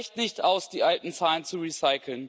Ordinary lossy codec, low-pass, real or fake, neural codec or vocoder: none; none; real; none